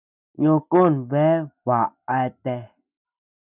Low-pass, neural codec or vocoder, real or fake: 3.6 kHz; none; real